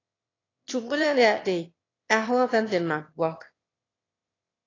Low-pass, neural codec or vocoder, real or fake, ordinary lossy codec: 7.2 kHz; autoencoder, 22.05 kHz, a latent of 192 numbers a frame, VITS, trained on one speaker; fake; AAC, 32 kbps